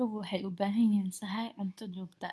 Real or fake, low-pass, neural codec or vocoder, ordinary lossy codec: fake; none; codec, 24 kHz, 0.9 kbps, WavTokenizer, medium speech release version 2; none